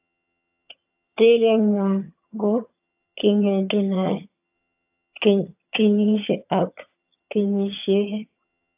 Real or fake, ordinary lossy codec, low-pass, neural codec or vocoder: fake; AAC, 32 kbps; 3.6 kHz; vocoder, 22.05 kHz, 80 mel bands, HiFi-GAN